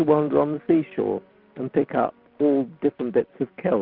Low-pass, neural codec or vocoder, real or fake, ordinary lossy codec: 5.4 kHz; none; real; Opus, 24 kbps